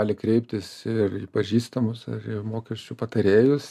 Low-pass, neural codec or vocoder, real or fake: 14.4 kHz; none; real